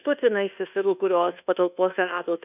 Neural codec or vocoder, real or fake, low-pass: codec, 24 kHz, 1.2 kbps, DualCodec; fake; 3.6 kHz